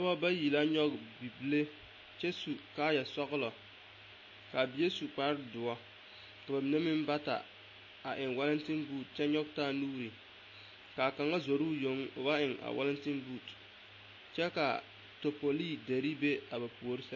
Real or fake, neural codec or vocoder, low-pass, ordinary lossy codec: real; none; 7.2 kHz; MP3, 32 kbps